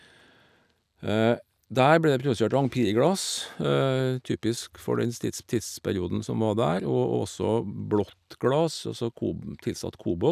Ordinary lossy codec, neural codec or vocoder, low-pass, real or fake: none; none; 14.4 kHz; real